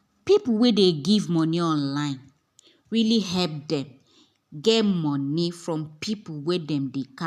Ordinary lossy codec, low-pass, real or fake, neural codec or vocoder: none; 14.4 kHz; real; none